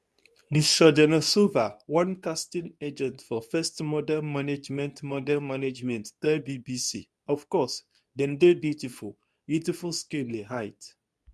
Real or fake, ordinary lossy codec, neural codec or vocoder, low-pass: fake; none; codec, 24 kHz, 0.9 kbps, WavTokenizer, medium speech release version 2; none